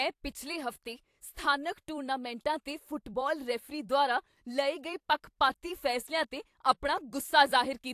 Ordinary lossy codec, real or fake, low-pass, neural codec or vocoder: AAC, 64 kbps; fake; 14.4 kHz; vocoder, 44.1 kHz, 128 mel bands every 256 samples, BigVGAN v2